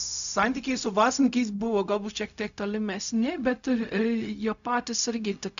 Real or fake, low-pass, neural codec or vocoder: fake; 7.2 kHz; codec, 16 kHz, 0.4 kbps, LongCat-Audio-Codec